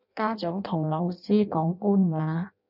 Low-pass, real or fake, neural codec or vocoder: 5.4 kHz; fake; codec, 16 kHz in and 24 kHz out, 0.6 kbps, FireRedTTS-2 codec